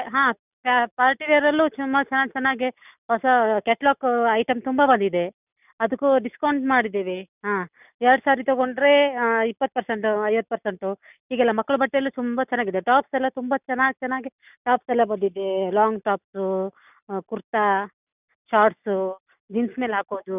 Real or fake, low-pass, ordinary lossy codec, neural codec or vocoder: real; 3.6 kHz; none; none